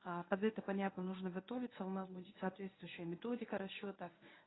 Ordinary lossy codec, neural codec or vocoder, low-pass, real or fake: AAC, 16 kbps; codec, 24 kHz, 0.9 kbps, WavTokenizer, medium speech release version 1; 7.2 kHz; fake